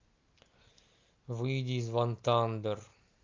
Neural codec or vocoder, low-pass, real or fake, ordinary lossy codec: none; 7.2 kHz; real; Opus, 24 kbps